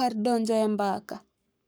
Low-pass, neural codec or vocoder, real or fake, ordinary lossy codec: none; codec, 44.1 kHz, 7.8 kbps, Pupu-Codec; fake; none